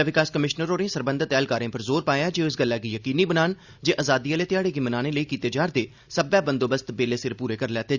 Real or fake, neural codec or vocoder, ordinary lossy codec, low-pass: real; none; Opus, 64 kbps; 7.2 kHz